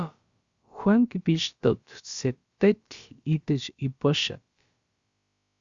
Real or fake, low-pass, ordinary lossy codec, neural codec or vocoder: fake; 7.2 kHz; Opus, 64 kbps; codec, 16 kHz, about 1 kbps, DyCAST, with the encoder's durations